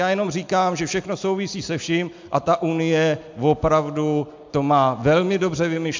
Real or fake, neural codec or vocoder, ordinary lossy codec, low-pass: real; none; MP3, 64 kbps; 7.2 kHz